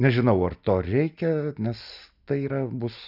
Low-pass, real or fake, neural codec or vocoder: 5.4 kHz; real; none